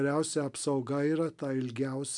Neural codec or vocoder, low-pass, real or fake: none; 10.8 kHz; real